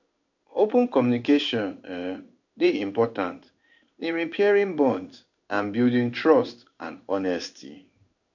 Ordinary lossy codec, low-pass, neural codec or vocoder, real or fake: none; 7.2 kHz; codec, 16 kHz in and 24 kHz out, 1 kbps, XY-Tokenizer; fake